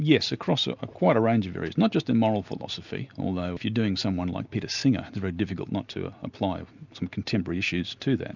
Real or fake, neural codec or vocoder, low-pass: real; none; 7.2 kHz